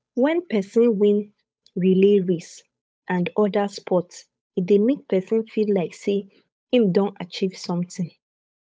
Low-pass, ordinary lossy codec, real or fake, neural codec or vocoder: none; none; fake; codec, 16 kHz, 8 kbps, FunCodec, trained on Chinese and English, 25 frames a second